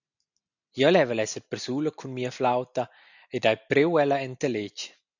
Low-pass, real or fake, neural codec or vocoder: 7.2 kHz; real; none